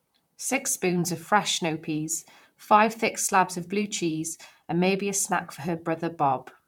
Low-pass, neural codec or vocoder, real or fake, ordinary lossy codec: 19.8 kHz; vocoder, 44.1 kHz, 128 mel bands, Pupu-Vocoder; fake; MP3, 96 kbps